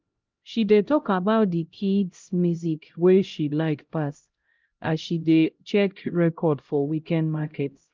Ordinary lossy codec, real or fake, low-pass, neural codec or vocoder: Opus, 24 kbps; fake; 7.2 kHz; codec, 16 kHz, 0.5 kbps, X-Codec, HuBERT features, trained on LibriSpeech